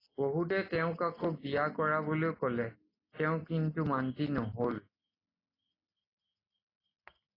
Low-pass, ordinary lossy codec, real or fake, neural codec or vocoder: 5.4 kHz; AAC, 24 kbps; real; none